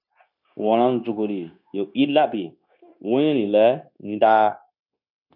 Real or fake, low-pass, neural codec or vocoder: fake; 5.4 kHz; codec, 16 kHz, 0.9 kbps, LongCat-Audio-Codec